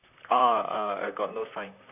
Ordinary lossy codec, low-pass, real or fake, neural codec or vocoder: none; 3.6 kHz; fake; vocoder, 44.1 kHz, 128 mel bands, Pupu-Vocoder